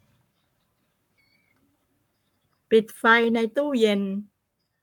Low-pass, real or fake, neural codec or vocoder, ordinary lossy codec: 19.8 kHz; fake; codec, 44.1 kHz, 7.8 kbps, Pupu-Codec; none